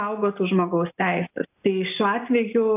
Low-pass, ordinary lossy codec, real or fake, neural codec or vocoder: 3.6 kHz; AAC, 32 kbps; real; none